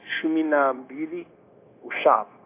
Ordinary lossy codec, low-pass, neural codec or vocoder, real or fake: AAC, 24 kbps; 3.6 kHz; codec, 16 kHz, 0.9 kbps, LongCat-Audio-Codec; fake